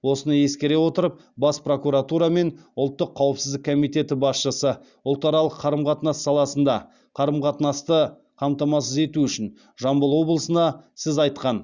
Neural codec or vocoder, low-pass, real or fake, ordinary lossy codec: none; 7.2 kHz; real; Opus, 64 kbps